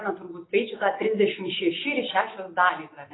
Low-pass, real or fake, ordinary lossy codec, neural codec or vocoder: 7.2 kHz; real; AAC, 16 kbps; none